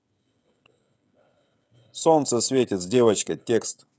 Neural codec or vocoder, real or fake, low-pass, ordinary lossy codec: codec, 16 kHz, 16 kbps, FreqCodec, smaller model; fake; none; none